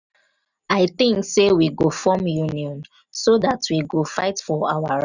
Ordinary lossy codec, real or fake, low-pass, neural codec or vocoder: none; real; 7.2 kHz; none